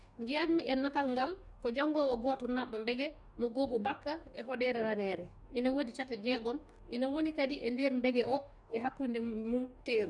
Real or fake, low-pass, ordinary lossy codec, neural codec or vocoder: fake; 10.8 kHz; none; codec, 44.1 kHz, 2.6 kbps, DAC